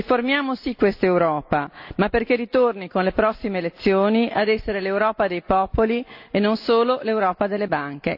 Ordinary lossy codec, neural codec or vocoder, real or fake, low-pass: AAC, 48 kbps; none; real; 5.4 kHz